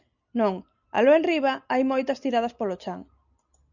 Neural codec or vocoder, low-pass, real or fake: none; 7.2 kHz; real